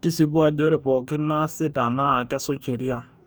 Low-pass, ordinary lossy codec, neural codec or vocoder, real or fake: none; none; codec, 44.1 kHz, 2.6 kbps, DAC; fake